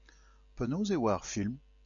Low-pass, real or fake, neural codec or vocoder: 7.2 kHz; real; none